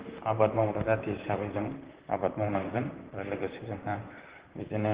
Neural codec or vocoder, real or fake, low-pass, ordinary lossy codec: vocoder, 44.1 kHz, 128 mel bands, Pupu-Vocoder; fake; 3.6 kHz; Opus, 16 kbps